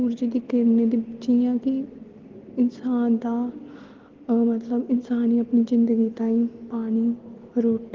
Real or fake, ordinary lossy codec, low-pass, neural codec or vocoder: real; Opus, 16 kbps; 7.2 kHz; none